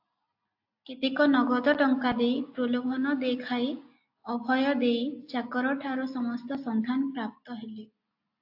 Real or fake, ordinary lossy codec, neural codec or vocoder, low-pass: real; AAC, 48 kbps; none; 5.4 kHz